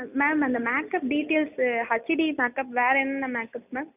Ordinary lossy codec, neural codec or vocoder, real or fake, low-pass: none; none; real; 3.6 kHz